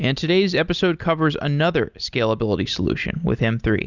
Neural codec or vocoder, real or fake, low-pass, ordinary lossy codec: none; real; 7.2 kHz; Opus, 64 kbps